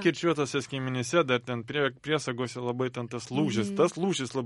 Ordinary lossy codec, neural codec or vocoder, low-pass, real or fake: MP3, 48 kbps; none; 19.8 kHz; real